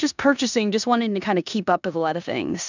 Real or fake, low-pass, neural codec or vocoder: fake; 7.2 kHz; codec, 16 kHz in and 24 kHz out, 0.9 kbps, LongCat-Audio-Codec, four codebook decoder